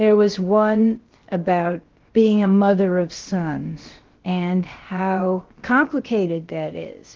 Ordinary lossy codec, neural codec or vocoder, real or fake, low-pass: Opus, 16 kbps; codec, 16 kHz, about 1 kbps, DyCAST, with the encoder's durations; fake; 7.2 kHz